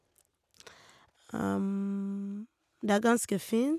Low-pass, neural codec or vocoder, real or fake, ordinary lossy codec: 14.4 kHz; none; real; none